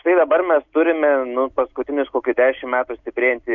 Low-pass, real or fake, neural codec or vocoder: 7.2 kHz; real; none